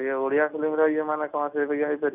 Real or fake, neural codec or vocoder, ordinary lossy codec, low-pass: real; none; none; 3.6 kHz